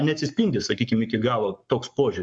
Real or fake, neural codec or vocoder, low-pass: fake; codec, 44.1 kHz, 7.8 kbps, Pupu-Codec; 9.9 kHz